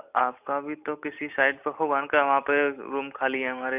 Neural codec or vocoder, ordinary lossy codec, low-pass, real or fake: none; none; 3.6 kHz; real